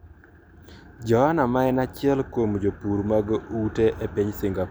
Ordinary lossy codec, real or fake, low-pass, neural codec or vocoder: none; real; none; none